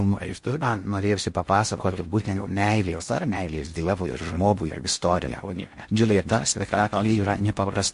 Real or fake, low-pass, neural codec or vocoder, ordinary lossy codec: fake; 10.8 kHz; codec, 16 kHz in and 24 kHz out, 0.6 kbps, FocalCodec, streaming, 2048 codes; MP3, 48 kbps